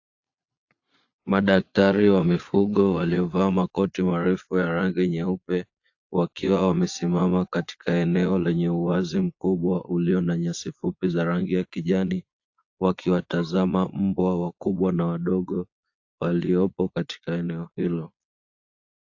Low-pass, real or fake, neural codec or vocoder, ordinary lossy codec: 7.2 kHz; fake; vocoder, 22.05 kHz, 80 mel bands, Vocos; AAC, 48 kbps